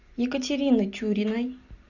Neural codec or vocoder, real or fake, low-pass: none; real; 7.2 kHz